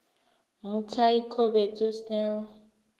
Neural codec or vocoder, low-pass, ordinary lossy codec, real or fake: codec, 32 kHz, 1.9 kbps, SNAC; 14.4 kHz; Opus, 16 kbps; fake